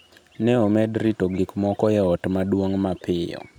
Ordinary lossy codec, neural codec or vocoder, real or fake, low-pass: none; none; real; 19.8 kHz